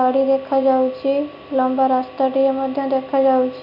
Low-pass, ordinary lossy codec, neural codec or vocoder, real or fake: 5.4 kHz; none; none; real